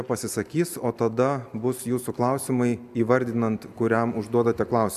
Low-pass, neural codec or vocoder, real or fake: 14.4 kHz; none; real